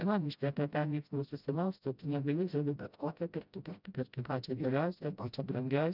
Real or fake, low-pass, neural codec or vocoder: fake; 5.4 kHz; codec, 16 kHz, 0.5 kbps, FreqCodec, smaller model